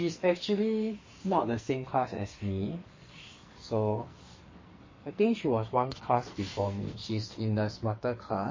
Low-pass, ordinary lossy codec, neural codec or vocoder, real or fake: 7.2 kHz; MP3, 32 kbps; codec, 44.1 kHz, 2.6 kbps, SNAC; fake